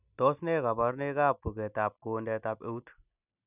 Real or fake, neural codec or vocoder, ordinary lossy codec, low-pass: real; none; none; 3.6 kHz